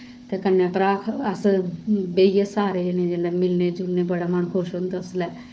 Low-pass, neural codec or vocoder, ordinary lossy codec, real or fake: none; codec, 16 kHz, 4 kbps, FunCodec, trained on Chinese and English, 50 frames a second; none; fake